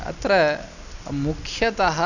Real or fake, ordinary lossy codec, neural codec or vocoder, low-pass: real; none; none; 7.2 kHz